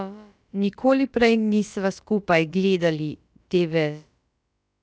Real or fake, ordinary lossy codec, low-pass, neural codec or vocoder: fake; none; none; codec, 16 kHz, about 1 kbps, DyCAST, with the encoder's durations